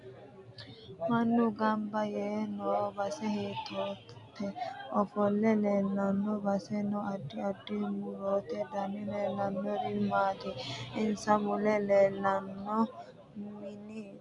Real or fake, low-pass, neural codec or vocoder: real; 10.8 kHz; none